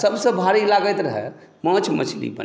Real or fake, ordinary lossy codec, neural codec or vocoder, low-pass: real; none; none; none